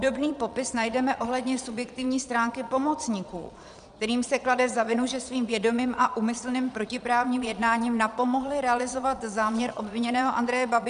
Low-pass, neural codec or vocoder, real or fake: 9.9 kHz; vocoder, 22.05 kHz, 80 mel bands, Vocos; fake